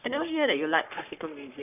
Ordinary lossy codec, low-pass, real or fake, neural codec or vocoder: none; 3.6 kHz; fake; codec, 44.1 kHz, 3.4 kbps, Pupu-Codec